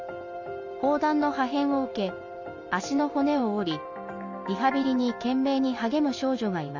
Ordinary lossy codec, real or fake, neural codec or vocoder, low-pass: none; real; none; 7.2 kHz